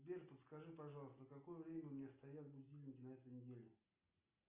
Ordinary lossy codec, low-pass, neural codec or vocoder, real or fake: Opus, 64 kbps; 3.6 kHz; none; real